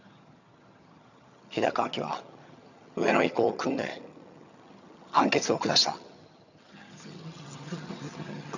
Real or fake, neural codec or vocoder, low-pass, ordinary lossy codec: fake; vocoder, 22.05 kHz, 80 mel bands, HiFi-GAN; 7.2 kHz; none